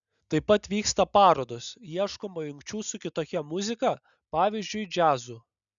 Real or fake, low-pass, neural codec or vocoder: real; 7.2 kHz; none